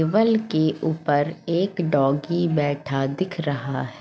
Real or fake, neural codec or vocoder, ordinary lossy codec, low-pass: real; none; none; none